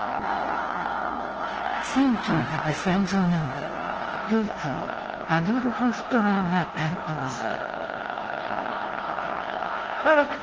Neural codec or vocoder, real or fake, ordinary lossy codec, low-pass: codec, 16 kHz, 0.5 kbps, FunCodec, trained on LibriTTS, 25 frames a second; fake; Opus, 16 kbps; 7.2 kHz